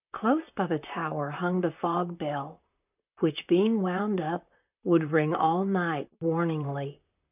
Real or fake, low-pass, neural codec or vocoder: fake; 3.6 kHz; vocoder, 44.1 kHz, 128 mel bands, Pupu-Vocoder